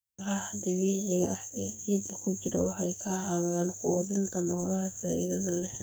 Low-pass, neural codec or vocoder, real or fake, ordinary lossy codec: none; codec, 44.1 kHz, 2.6 kbps, SNAC; fake; none